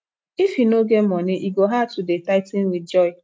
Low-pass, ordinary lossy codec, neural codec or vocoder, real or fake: none; none; none; real